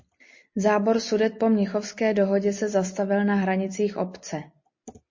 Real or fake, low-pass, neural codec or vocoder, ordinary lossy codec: real; 7.2 kHz; none; MP3, 32 kbps